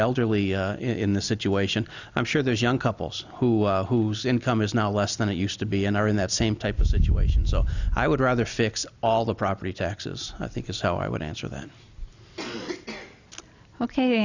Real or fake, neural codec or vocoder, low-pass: real; none; 7.2 kHz